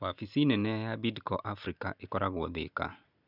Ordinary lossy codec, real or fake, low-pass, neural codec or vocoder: none; real; 5.4 kHz; none